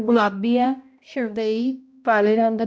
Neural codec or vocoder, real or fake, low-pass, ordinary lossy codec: codec, 16 kHz, 0.5 kbps, X-Codec, HuBERT features, trained on balanced general audio; fake; none; none